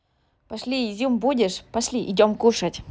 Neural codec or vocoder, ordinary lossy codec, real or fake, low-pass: none; none; real; none